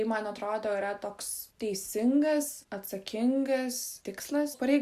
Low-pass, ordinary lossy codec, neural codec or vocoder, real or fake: 14.4 kHz; Opus, 64 kbps; none; real